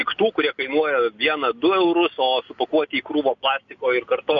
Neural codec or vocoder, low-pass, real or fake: none; 7.2 kHz; real